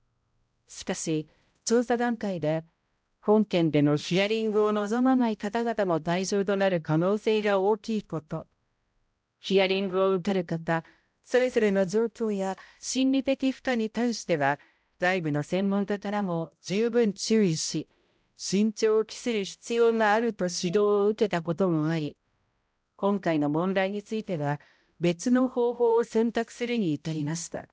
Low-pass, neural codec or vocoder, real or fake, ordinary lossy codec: none; codec, 16 kHz, 0.5 kbps, X-Codec, HuBERT features, trained on balanced general audio; fake; none